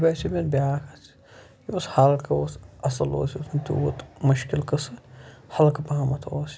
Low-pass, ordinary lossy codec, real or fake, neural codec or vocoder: none; none; real; none